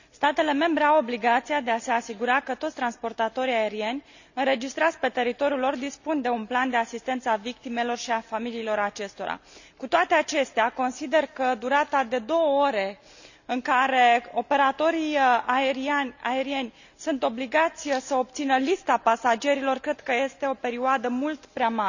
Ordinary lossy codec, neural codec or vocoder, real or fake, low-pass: none; none; real; 7.2 kHz